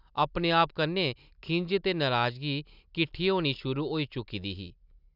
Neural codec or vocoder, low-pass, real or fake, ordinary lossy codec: none; 5.4 kHz; real; none